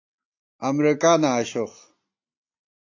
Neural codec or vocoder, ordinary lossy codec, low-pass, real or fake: none; AAC, 48 kbps; 7.2 kHz; real